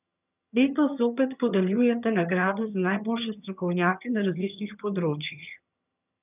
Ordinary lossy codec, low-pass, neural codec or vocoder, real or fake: none; 3.6 kHz; vocoder, 22.05 kHz, 80 mel bands, HiFi-GAN; fake